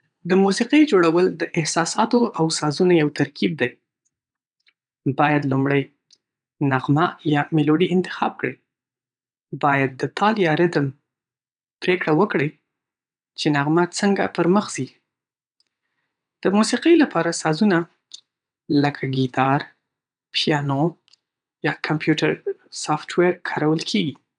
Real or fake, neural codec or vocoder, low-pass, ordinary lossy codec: fake; vocoder, 22.05 kHz, 80 mel bands, WaveNeXt; 9.9 kHz; none